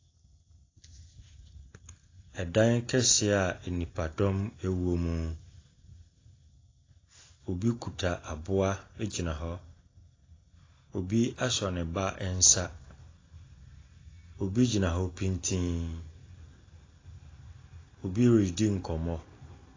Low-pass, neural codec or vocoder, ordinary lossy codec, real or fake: 7.2 kHz; none; AAC, 32 kbps; real